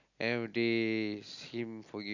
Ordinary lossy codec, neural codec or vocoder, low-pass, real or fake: none; none; 7.2 kHz; real